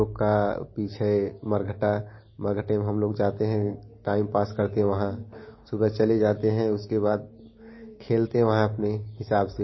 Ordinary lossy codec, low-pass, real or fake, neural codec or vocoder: MP3, 24 kbps; 7.2 kHz; real; none